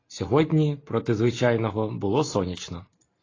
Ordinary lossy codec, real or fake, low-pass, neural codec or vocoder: AAC, 32 kbps; real; 7.2 kHz; none